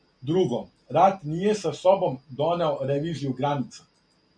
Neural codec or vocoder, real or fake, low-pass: none; real; 9.9 kHz